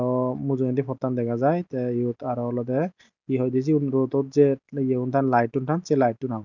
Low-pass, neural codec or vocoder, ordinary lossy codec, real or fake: 7.2 kHz; none; none; real